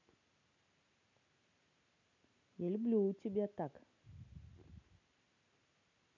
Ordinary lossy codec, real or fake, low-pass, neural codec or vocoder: none; real; 7.2 kHz; none